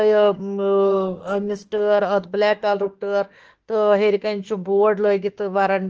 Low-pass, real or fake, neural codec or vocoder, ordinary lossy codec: 7.2 kHz; fake; autoencoder, 48 kHz, 32 numbers a frame, DAC-VAE, trained on Japanese speech; Opus, 16 kbps